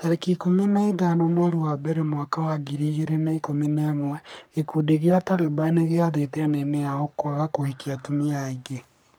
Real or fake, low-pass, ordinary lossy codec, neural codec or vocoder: fake; none; none; codec, 44.1 kHz, 3.4 kbps, Pupu-Codec